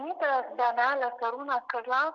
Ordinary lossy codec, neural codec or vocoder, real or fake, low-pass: MP3, 64 kbps; none; real; 7.2 kHz